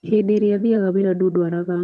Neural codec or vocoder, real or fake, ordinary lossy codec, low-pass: vocoder, 22.05 kHz, 80 mel bands, HiFi-GAN; fake; none; none